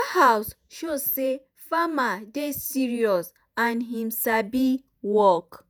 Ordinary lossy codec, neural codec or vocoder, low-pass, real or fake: none; vocoder, 48 kHz, 128 mel bands, Vocos; none; fake